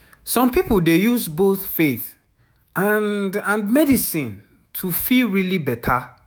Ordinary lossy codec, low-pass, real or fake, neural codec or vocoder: none; none; fake; autoencoder, 48 kHz, 128 numbers a frame, DAC-VAE, trained on Japanese speech